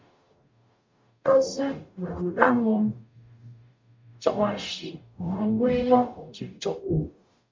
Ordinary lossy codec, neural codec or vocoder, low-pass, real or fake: MP3, 48 kbps; codec, 44.1 kHz, 0.9 kbps, DAC; 7.2 kHz; fake